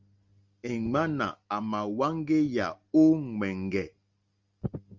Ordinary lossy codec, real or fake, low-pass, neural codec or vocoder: Opus, 32 kbps; real; 7.2 kHz; none